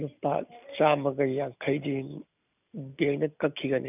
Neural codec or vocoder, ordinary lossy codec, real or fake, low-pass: none; none; real; 3.6 kHz